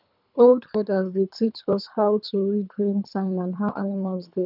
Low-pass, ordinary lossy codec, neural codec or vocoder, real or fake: 5.4 kHz; none; codec, 24 kHz, 3 kbps, HILCodec; fake